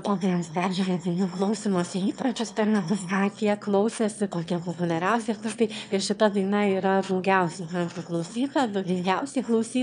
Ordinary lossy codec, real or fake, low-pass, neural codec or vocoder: MP3, 96 kbps; fake; 9.9 kHz; autoencoder, 22.05 kHz, a latent of 192 numbers a frame, VITS, trained on one speaker